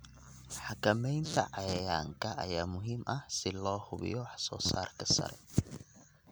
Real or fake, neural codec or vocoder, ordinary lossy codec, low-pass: real; none; none; none